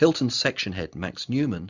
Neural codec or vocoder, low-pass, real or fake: none; 7.2 kHz; real